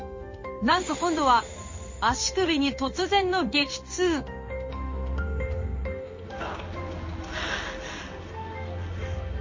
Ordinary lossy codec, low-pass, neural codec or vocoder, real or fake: MP3, 32 kbps; 7.2 kHz; codec, 16 kHz in and 24 kHz out, 1 kbps, XY-Tokenizer; fake